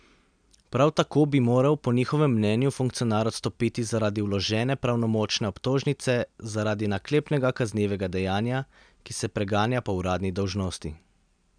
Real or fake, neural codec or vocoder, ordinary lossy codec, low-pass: real; none; none; 9.9 kHz